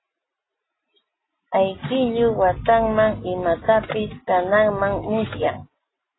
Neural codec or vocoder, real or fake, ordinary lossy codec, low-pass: none; real; AAC, 16 kbps; 7.2 kHz